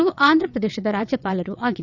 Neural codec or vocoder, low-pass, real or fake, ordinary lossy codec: vocoder, 22.05 kHz, 80 mel bands, WaveNeXt; 7.2 kHz; fake; none